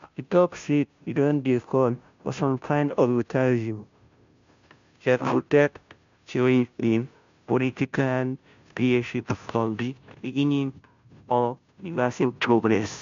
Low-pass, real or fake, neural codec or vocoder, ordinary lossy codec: 7.2 kHz; fake; codec, 16 kHz, 0.5 kbps, FunCodec, trained on Chinese and English, 25 frames a second; MP3, 64 kbps